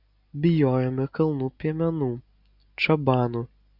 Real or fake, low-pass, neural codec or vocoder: real; 5.4 kHz; none